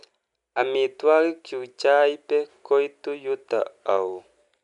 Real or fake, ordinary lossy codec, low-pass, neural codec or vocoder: real; none; 10.8 kHz; none